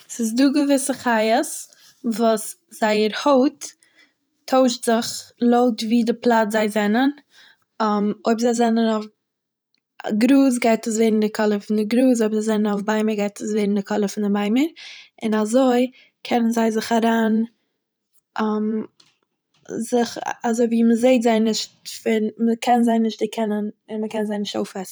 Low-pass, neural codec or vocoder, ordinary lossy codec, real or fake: none; vocoder, 44.1 kHz, 128 mel bands every 512 samples, BigVGAN v2; none; fake